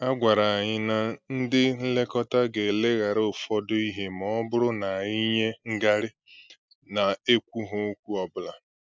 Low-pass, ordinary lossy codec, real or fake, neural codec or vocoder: none; none; real; none